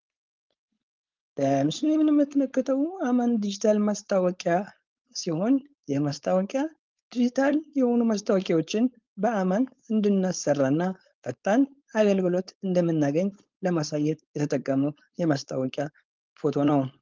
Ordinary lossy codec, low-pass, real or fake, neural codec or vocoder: Opus, 32 kbps; 7.2 kHz; fake; codec, 16 kHz, 4.8 kbps, FACodec